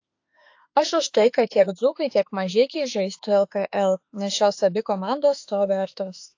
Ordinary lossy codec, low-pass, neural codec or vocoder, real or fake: AAC, 48 kbps; 7.2 kHz; autoencoder, 48 kHz, 32 numbers a frame, DAC-VAE, trained on Japanese speech; fake